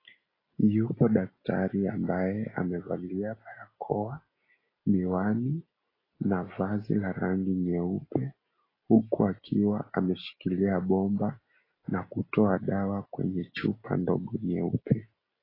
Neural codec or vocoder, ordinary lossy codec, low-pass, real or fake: none; AAC, 24 kbps; 5.4 kHz; real